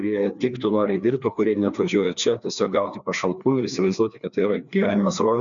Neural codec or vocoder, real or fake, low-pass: codec, 16 kHz, 2 kbps, FreqCodec, larger model; fake; 7.2 kHz